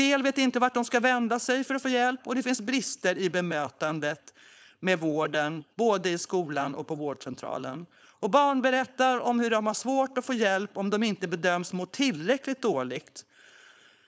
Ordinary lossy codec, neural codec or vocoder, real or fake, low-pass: none; codec, 16 kHz, 4.8 kbps, FACodec; fake; none